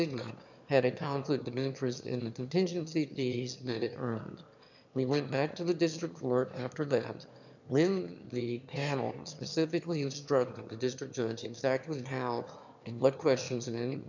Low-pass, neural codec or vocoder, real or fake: 7.2 kHz; autoencoder, 22.05 kHz, a latent of 192 numbers a frame, VITS, trained on one speaker; fake